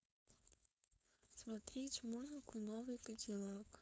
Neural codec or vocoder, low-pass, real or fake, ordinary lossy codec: codec, 16 kHz, 4.8 kbps, FACodec; none; fake; none